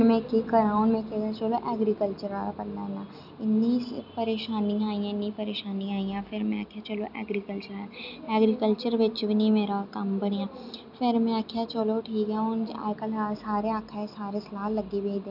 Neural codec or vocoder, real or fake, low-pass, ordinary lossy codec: none; real; 5.4 kHz; none